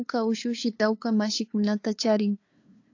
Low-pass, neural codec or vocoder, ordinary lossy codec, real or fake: 7.2 kHz; codec, 16 kHz, 2 kbps, FunCodec, trained on LibriTTS, 25 frames a second; AAC, 48 kbps; fake